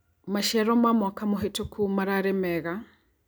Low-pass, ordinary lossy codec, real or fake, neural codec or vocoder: none; none; real; none